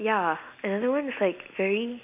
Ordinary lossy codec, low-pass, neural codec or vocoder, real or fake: none; 3.6 kHz; none; real